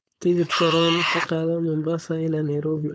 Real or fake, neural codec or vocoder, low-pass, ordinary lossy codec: fake; codec, 16 kHz, 4.8 kbps, FACodec; none; none